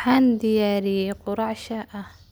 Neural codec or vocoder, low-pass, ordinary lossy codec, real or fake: none; none; none; real